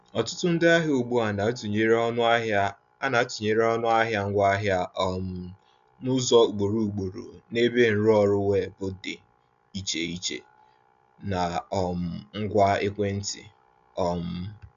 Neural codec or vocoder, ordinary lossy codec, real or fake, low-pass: none; none; real; 7.2 kHz